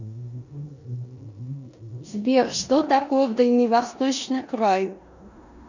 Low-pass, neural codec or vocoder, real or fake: 7.2 kHz; codec, 16 kHz in and 24 kHz out, 0.9 kbps, LongCat-Audio-Codec, four codebook decoder; fake